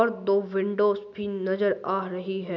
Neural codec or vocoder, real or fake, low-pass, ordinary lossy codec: none; real; 7.2 kHz; none